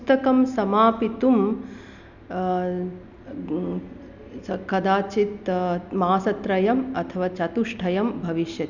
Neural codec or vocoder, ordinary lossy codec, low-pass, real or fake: none; none; 7.2 kHz; real